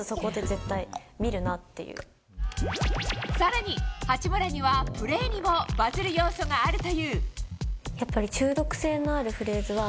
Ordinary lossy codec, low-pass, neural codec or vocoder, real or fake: none; none; none; real